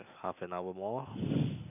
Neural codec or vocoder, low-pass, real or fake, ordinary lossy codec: none; 3.6 kHz; real; none